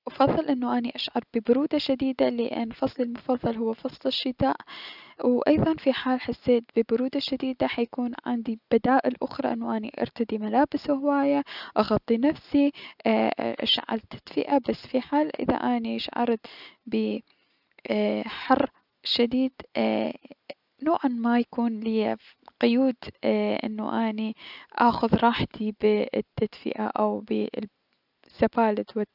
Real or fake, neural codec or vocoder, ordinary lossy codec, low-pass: real; none; none; 5.4 kHz